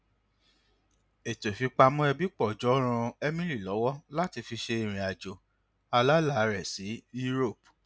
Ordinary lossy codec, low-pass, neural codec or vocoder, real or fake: none; none; none; real